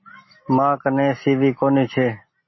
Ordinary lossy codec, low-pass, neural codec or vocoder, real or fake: MP3, 24 kbps; 7.2 kHz; none; real